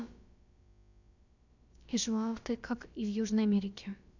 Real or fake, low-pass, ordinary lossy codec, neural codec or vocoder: fake; 7.2 kHz; none; codec, 16 kHz, about 1 kbps, DyCAST, with the encoder's durations